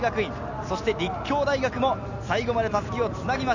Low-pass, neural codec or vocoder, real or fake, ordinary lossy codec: 7.2 kHz; none; real; none